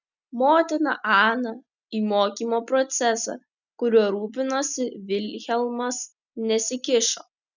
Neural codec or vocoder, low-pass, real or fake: none; 7.2 kHz; real